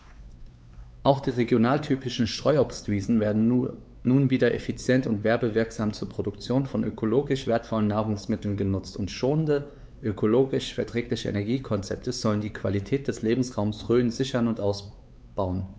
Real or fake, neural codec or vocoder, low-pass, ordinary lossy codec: fake; codec, 16 kHz, 4 kbps, X-Codec, WavLM features, trained on Multilingual LibriSpeech; none; none